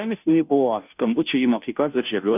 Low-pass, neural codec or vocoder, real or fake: 3.6 kHz; codec, 16 kHz, 0.5 kbps, FunCodec, trained on Chinese and English, 25 frames a second; fake